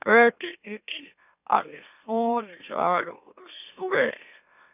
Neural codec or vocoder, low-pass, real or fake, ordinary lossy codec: autoencoder, 44.1 kHz, a latent of 192 numbers a frame, MeloTTS; 3.6 kHz; fake; none